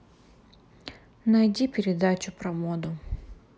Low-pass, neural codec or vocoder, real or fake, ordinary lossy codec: none; none; real; none